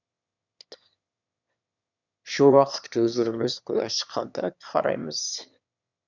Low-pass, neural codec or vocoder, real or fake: 7.2 kHz; autoencoder, 22.05 kHz, a latent of 192 numbers a frame, VITS, trained on one speaker; fake